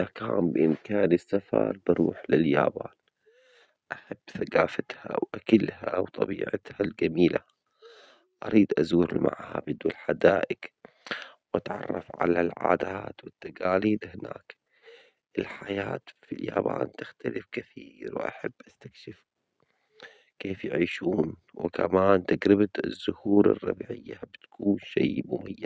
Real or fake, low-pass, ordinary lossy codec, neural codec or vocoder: real; none; none; none